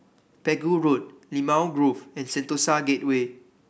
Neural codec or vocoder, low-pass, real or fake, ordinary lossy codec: none; none; real; none